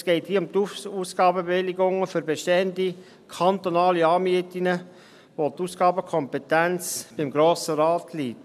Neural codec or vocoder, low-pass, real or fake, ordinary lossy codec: none; 14.4 kHz; real; none